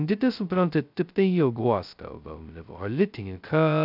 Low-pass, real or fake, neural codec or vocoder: 5.4 kHz; fake; codec, 16 kHz, 0.2 kbps, FocalCodec